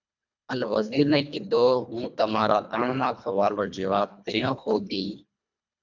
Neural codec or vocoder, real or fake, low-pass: codec, 24 kHz, 1.5 kbps, HILCodec; fake; 7.2 kHz